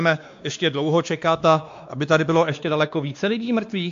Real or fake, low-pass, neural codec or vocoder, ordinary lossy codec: fake; 7.2 kHz; codec, 16 kHz, 2 kbps, X-Codec, WavLM features, trained on Multilingual LibriSpeech; AAC, 64 kbps